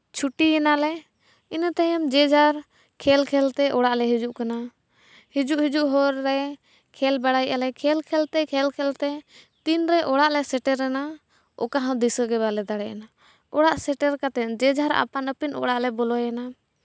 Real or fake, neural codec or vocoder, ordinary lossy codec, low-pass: real; none; none; none